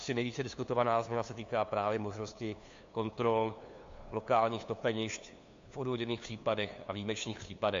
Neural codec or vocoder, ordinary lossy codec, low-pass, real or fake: codec, 16 kHz, 2 kbps, FunCodec, trained on LibriTTS, 25 frames a second; MP3, 48 kbps; 7.2 kHz; fake